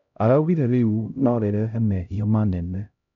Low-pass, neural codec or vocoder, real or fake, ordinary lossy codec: 7.2 kHz; codec, 16 kHz, 0.5 kbps, X-Codec, HuBERT features, trained on LibriSpeech; fake; none